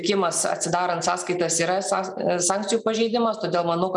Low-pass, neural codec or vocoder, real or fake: 10.8 kHz; none; real